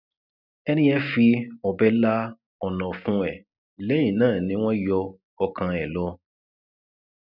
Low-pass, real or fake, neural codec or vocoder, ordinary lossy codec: 5.4 kHz; real; none; none